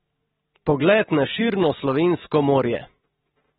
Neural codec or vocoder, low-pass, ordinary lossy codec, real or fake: none; 19.8 kHz; AAC, 16 kbps; real